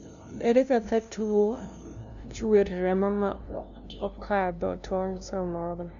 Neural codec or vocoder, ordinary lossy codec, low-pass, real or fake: codec, 16 kHz, 0.5 kbps, FunCodec, trained on LibriTTS, 25 frames a second; MP3, 96 kbps; 7.2 kHz; fake